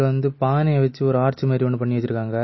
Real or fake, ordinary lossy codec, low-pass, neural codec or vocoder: real; MP3, 24 kbps; 7.2 kHz; none